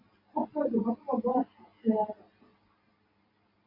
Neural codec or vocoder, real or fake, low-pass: none; real; 5.4 kHz